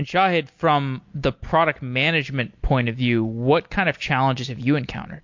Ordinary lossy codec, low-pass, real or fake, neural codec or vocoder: MP3, 48 kbps; 7.2 kHz; real; none